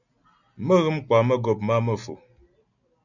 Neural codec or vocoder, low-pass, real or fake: none; 7.2 kHz; real